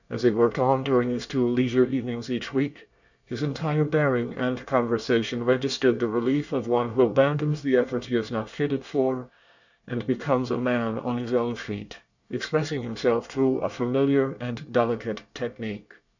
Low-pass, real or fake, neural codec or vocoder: 7.2 kHz; fake; codec, 24 kHz, 1 kbps, SNAC